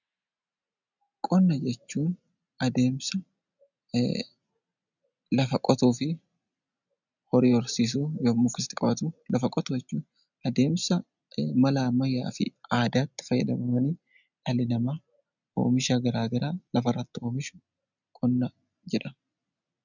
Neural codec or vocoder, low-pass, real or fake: none; 7.2 kHz; real